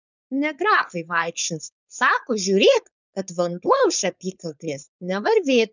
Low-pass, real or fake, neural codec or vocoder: 7.2 kHz; fake; codec, 16 kHz, 4.8 kbps, FACodec